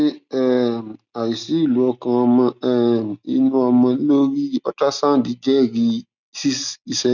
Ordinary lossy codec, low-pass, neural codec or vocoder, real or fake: none; 7.2 kHz; none; real